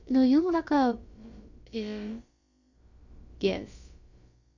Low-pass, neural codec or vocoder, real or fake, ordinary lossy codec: 7.2 kHz; codec, 16 kHz, about 1 kbps, DyCAST, with the encoder's durations; fake; Opus, 64 kbps